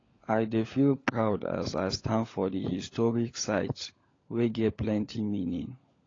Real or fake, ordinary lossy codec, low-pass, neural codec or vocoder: fake; AAC, 32 kbps; 7.2 kHz; codec, 16 kHz, 16 kbps, FunCodec, trained on LibriTTS, 50 frames a second